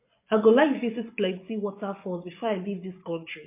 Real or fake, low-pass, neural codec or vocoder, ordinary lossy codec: fake; 3.6 kHz; vocoder, 24 kHz, 100 mel bands, Vocos; MP3, 24 kbps